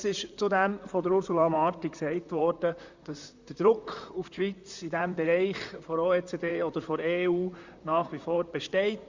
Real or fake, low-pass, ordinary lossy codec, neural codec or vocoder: fake; 7.2 kHz; Opus, 64 kbps; vocoder, 44.1 kHz, 128 mel bands, Pupu-Vocoder